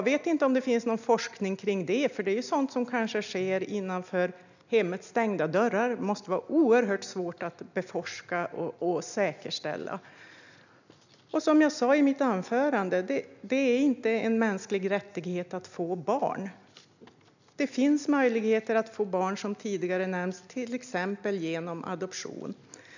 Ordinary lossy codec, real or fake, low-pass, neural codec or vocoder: none; real; 7.2 kHz; none